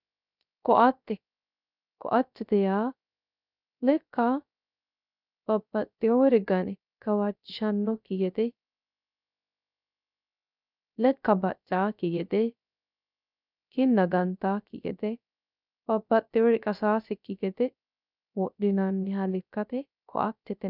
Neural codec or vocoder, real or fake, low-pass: codec, 16 kHz, 0.3 kbps, FocalCodec; fake; 5.4 kHz